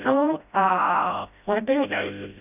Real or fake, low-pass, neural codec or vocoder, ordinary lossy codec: fake; 3.6 kHz; codec, 16 kHz, 0.5 kbps, FreqCodec, smaller model; none